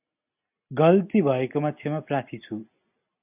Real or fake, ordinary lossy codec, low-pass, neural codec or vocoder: real; AAC, 32 kbps; 3.6 kHz; none